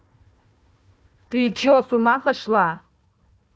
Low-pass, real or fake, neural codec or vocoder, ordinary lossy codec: none; fake; codec, 16 kHz, 1 kbps, FunCodec, trained on Chinese and English, 50 frames a second; none